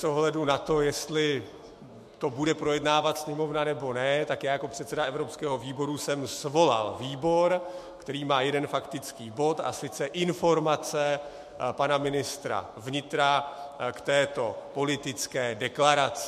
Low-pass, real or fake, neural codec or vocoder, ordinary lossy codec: 14.4 kHz; fake; autoencoder, 48 kHz, 128 numbers a frame, DAC-VAE, trained on Japanese speech; MP3, 64 kbps